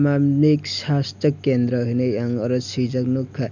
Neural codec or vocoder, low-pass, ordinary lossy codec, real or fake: none; 7.2 kHz; none; real